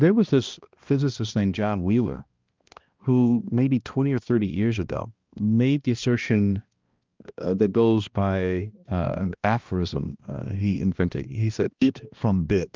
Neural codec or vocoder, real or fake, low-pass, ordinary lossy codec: codec, 16 kHz, 1 kbps, X-Codec, HuBERT features, trained on balanced general audio; fake; 7.2 kHz; Opus, 24 kbps